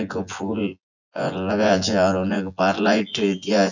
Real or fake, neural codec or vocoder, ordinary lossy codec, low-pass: fake; vocoder, 24 kHz, 100 mel bands, Vocos; none; 7.2 kHz